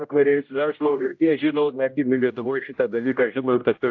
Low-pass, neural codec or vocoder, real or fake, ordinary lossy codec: 7.2 kHz; codec, 16 kHz, 0.5 kbps, X-Codec, HuBERT features, trained on general audio; fake; AAC, 48 kbps